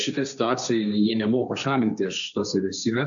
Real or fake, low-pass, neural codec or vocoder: fake; 7.2 kHz; codec, 16 kHz, 1.1 kbps, Voila-Tokenizer